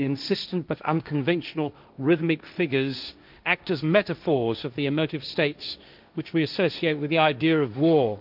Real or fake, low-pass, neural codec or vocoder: fake; 5.4 kHz; codec, 16 kHz, 1.1 kbps, Voila-Tokenizer